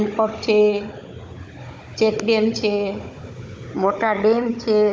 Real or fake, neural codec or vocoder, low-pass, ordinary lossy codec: fake; codec, 16 kHz, 16 kbps, FunCodec, trained on Chinese and English, 50 frames a second; none; none